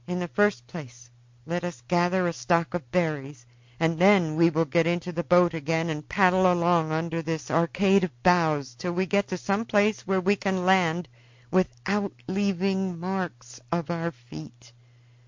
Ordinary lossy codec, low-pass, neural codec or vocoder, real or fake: MP3, 48 kbps; 7.2 kHz; none; real